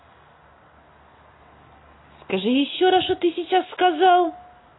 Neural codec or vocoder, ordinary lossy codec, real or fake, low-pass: none; AAC, 16 kbps; real; 7.2 kHz